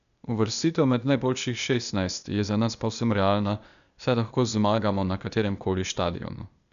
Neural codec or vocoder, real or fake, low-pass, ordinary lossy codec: codec, 16 kHz, 0.8 kbps, ZipCodec; fake; 7.2 kHz; none